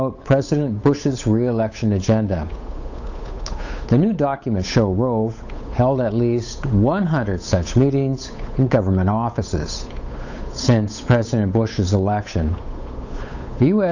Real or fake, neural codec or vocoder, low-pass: fake; codec, 16 kHz, 8 kbps, FunCodec, trained on Chinese and English, 25 frames a second; 7.2 kHz